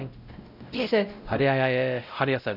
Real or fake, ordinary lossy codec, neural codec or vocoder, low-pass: fake; none; codec, 16 kHz, 0.5 kbps, X-Codec, WavLM features, trained on Multilingual LibriSpeech; 5.4 kHz